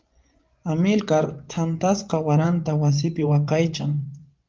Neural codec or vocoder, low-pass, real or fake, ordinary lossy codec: vocoder, 24 kHz, 100 mel bands, Vocos; 7.2 kHz; fake; Opus, 32 kbps